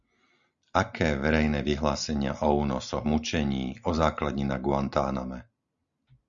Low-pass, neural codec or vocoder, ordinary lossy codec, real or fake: 7.2 kHz; none; Opus, 64 kbps; real